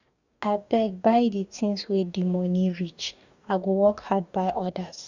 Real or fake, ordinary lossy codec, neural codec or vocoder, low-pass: fake; none; codec, 44.1 kHz, 2.6 kbps, DAC; 7.2 kHz